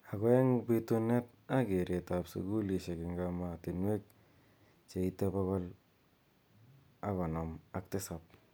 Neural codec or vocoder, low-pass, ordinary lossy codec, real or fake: none; none; none; real